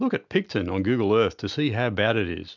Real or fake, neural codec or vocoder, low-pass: real; none; 7.2 kHz